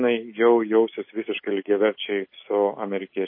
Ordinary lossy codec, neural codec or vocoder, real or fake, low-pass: MP3, 24 kbps; none; real; 5.4 kHz